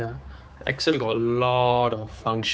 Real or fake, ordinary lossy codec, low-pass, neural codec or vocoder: fake; none; none; codec, 16 kHz, 4 kbps, X-Codec, HuBERT features, trained on general audio